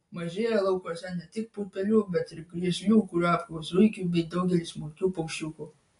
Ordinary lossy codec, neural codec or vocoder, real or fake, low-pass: AAC, 48 kbps; none; real; 10.8 kHz